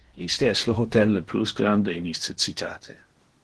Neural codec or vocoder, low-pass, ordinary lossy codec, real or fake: codec, 16 kHz in and 24 kHz out, 0.8 kbps, FocalCodec, streaming, 65536 codes; 10.8 kHz; Opus, 16 kbps; fake